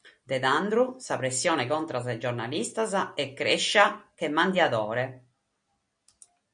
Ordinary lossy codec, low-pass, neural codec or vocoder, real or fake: MP3, 64 kbps; 9.9 kHz; none; real